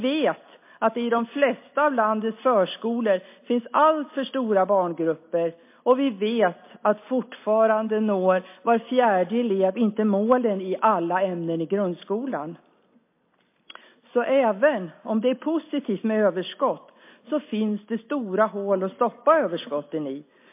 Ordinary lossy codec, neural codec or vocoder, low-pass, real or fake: MP3, 24 kbps; none; 3.6 kHz; real